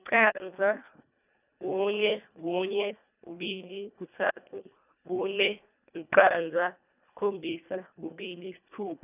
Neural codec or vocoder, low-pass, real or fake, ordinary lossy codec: codec, 24 kHz, 1.5 kbps, HILCodec; 3.6 kHz; fake; none